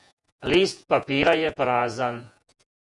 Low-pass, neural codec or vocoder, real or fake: 10.8 kHz; vocoder, 48 kHz, 128 mel bands, Vocos; fake